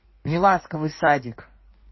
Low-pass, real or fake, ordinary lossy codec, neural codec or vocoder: 7.2 kHz; fake; MP3, 24 kbps; codec, 16 kHz in and 24 kHz out, 1.1 kbps, FireRedTTS-2 codec